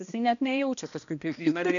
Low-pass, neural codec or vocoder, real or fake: 7.2 kHz; codec, 16 kHz, 1 kbps, X-Codec, HuBERT features, trained on balanced general audio; fake